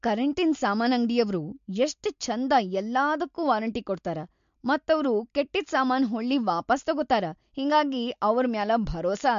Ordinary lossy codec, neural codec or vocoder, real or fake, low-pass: MP3, 48 kbps; none; real; 7.2 kHz